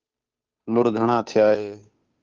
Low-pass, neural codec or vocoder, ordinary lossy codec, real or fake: 7.2 kHz; codec, 16 kHz, 2 kbps, FunCodec, trained on Chinese and English, 25 frames a second; Opus, 24 kbps; fake